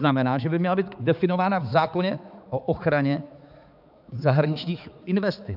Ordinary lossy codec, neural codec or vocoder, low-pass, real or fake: AAC, 48 kbps; codec, 16 kHz, 4 kbps, X-Codec, HuBERT features, trained on balanced general audio; 5.4 kHz; fake